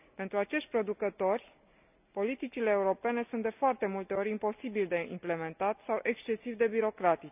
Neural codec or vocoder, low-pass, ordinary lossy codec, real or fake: none; 3.6 kHz; none; real